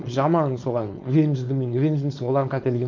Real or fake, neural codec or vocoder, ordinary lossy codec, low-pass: fake; codec, 16 kHz, 4.8 kbps, FACodec; MP3, 48 kbps; 7.2 kHz